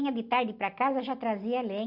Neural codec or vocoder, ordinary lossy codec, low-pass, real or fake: none; Opus, 64 kbps; 5.4 kHz; real